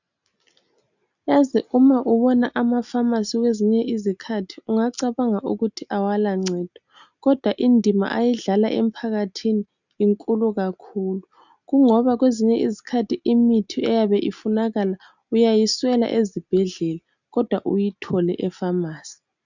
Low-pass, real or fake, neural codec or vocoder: 7.2 kHz; real; none